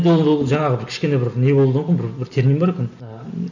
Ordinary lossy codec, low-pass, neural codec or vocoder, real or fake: none; 7.2 kHz; none; real